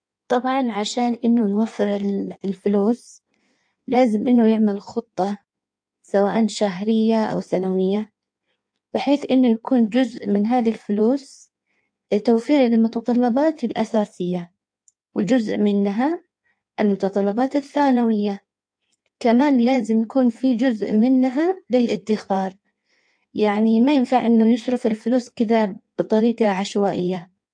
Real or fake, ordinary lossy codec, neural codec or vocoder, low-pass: fake; AAC, 64 kbps; codec, 16 kHz in and 24 kHz out, 1.1 kbps, FireRedTTS-2 codec; 9.9 kHz